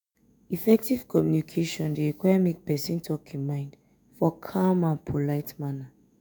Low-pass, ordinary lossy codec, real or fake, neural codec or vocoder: none; none; fake; autoencoder, 48 kHz, 128 numbers a frame, DAC-VAE, trained on Japanese speech